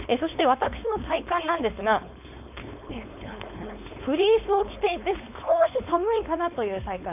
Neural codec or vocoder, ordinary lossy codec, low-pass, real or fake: codec, 16 kHz, 4.8 kbps, FACodec; none; 3.6 kHz; fake